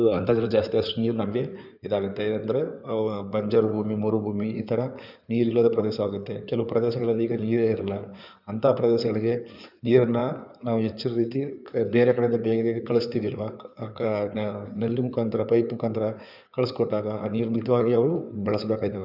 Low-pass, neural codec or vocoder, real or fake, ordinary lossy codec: 5.4 kHz; codec, 16 kHz in and 24 kHz out, 2.2 kbps, FireRedTTS-2 codec; fake; none